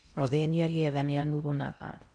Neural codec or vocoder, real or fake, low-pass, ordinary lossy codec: codec, 16 kHz in and 24 kHz out, 0.6 kbps, FocalCodec, streaming, 2048 codes; fake; 9.9 kHz; none